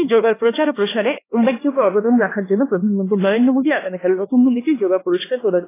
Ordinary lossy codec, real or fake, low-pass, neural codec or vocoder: AAC, 16 kbps; fake; 3.6 kHz; codec, 16 kHz, 2 kbps, X-Codec, HuBERT features, trained on LibriSpeech